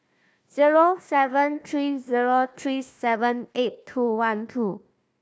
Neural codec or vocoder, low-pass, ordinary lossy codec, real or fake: codec, 16 kHz, 1 kbps, FunCodec, trained on Chinese and English, 50 frames a second; none; none; fake